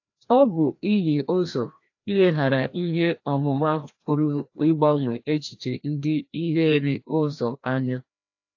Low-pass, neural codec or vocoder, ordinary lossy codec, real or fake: 7.2 kHz; codec, 16 kHz, 1 kbps, FreqCodec, larger model; AAC, 48 kbps; fake